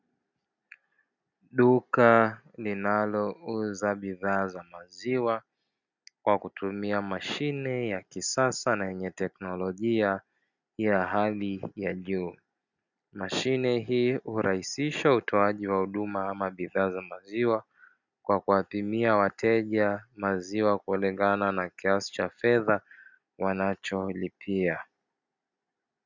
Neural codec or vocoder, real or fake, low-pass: none; real; 7.2 kHz